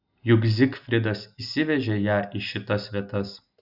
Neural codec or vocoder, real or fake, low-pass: none; real; 5.4 kHz